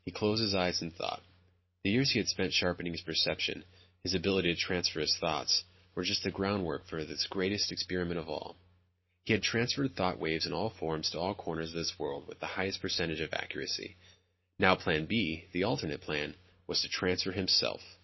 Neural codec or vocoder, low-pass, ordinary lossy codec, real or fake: none; 7.2 kHz; MP3, 24 kbps; real